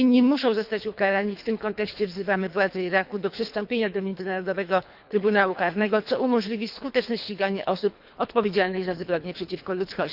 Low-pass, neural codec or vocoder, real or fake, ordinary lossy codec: 5.4 kHz; codec, 24 kHz, 3 kbps, HILCodec; fake; Opus, 64 kbps